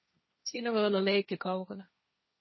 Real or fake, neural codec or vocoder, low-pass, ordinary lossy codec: fake; codec, 16 kHz, 1.1 kbps, Voila-Tokenizer; 7.2 kHz; MP3, 24 kbps